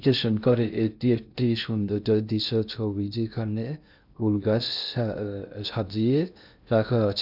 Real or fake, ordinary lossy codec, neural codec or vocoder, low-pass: fake; none; codec, 16 kHz in and 24 kHz out, 0.6 kbps, FocalCodec, streaming, 2048 codes; 5.4 kHz